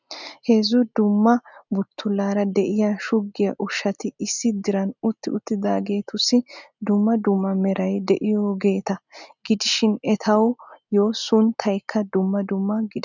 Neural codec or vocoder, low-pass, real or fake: none; 7.2 kHz; real